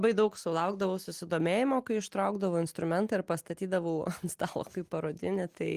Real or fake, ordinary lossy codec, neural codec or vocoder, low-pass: real; Opus, 24 kbps; none; 14.4 kHz